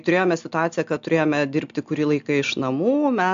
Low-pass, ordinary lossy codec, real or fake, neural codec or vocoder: 7.2 kHz; AAC, 64 kbps; real; none